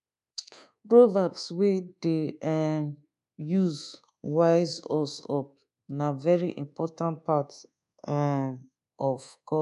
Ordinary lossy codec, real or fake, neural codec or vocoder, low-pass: none; fake; codec, 24 kHz, 1.2 kbps, DualCodec; 10.8 kHz